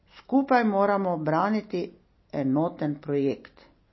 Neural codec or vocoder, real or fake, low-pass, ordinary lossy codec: none; real; 7.2 kHz; MP3, 24 kbps